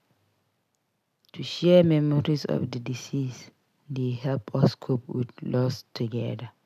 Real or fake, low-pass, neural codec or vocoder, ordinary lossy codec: real; 14.4 kHz; none; none